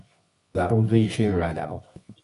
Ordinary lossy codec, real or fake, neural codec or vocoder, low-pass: MP3, 96 kbps; fake; codec, 24 kHz, 0.9 kbps, WavTokenizer, medium music audio release; 10.8 kHz